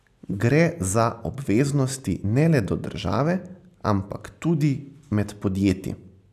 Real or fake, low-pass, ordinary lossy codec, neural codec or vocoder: real; 14.4 kHz; AAC, 96 kbps; none